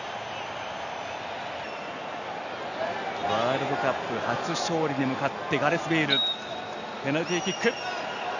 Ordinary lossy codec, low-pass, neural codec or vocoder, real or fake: none; 7.2 kHz; none; real